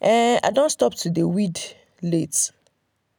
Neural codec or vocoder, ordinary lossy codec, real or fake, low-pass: none; none; real; none